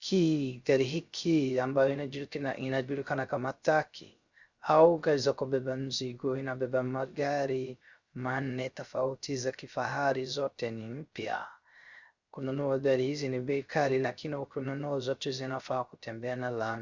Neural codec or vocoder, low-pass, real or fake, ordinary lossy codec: codec, 16 kHz, 0.3 kbps, FocalCodec; 7.2 kHz; fake; Opus, 64 kbps